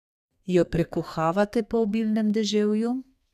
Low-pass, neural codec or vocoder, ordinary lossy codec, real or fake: 14.4 kHz; codec, 32 kHz, 1.9 kbps, SNAC; none; fake